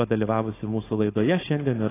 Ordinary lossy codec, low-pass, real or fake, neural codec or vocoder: AAC, 16 kbps; 3.6 kHz; fake; codec, 16 kHz, 4.8 kbps, FACodec